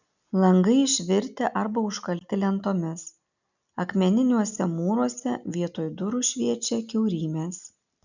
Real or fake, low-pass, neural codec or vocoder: real; 7.2 kHz; none